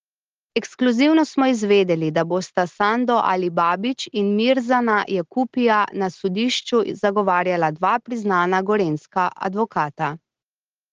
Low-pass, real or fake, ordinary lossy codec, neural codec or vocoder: 7.2 kHz; real; Opus, 16 kbps; none